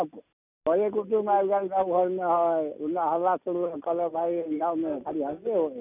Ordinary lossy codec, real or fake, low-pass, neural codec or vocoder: none; real; 3.6 kHz; none